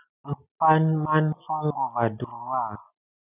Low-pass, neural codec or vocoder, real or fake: 3.6 kHz; none; real